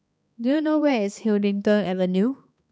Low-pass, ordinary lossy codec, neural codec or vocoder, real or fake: none; none; codec, 16 kHz, 2 kbps, X-Codec, HuBERT features, trained on balanced general audio; fake